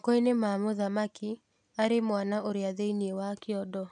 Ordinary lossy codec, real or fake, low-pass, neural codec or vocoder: none; real; 9.9 kHz; none